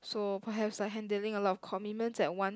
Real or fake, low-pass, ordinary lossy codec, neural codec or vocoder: real; none; none; none